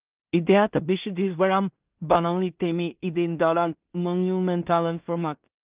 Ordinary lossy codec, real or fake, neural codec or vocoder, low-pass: Opus, 32 kbps; fake; codec, 16 kHz in and 24 kHz out, 0.4 kbps, LongCat-Audio-Codec, two codebook decoder; 3.6 kHz